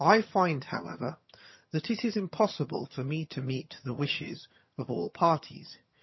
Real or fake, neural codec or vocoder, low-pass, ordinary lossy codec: fake; vocoder, 22.05 kHz, 80 mel bands, HiFi-GAN; 7.2 kHz; MP3, 24 kbps